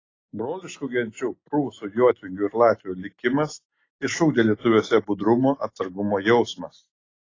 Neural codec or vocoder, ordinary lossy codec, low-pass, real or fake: none; AAC, 32 kbps; 7.2 kHz; real